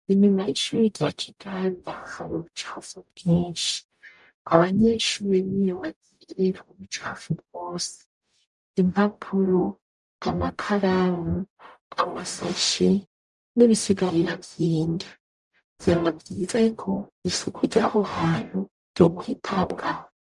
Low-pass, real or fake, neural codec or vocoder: 10.8 kHz; fake; codec, 44.1 kHz, 0.9 kbps, DAC